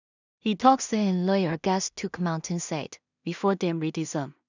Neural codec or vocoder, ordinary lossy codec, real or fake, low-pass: codec, 16 kHz in and 24 kHz out, 0.4 kbps, LongCat-Audio-Codec, two codebook decoder; none; fake; 7.2 kHz